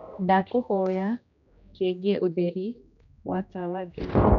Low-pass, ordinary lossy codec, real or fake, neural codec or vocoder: 7.2 kHz; none; fake; codec, 16 kHz, 1 kbps, X-Codec, HuBERT features, trained on general audio